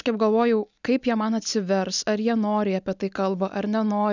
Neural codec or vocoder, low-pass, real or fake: none; 7.2 kHz; real